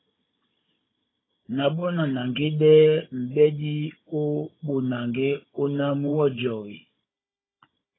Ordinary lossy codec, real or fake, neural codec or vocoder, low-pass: AAC, 16 kbps; fake; codec, 16 kHz, 16 kbps, FunCodec, trained on Chinese and English, 50 frames a second; 7.2 kHz